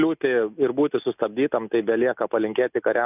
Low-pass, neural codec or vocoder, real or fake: 3.6 kHz; none; real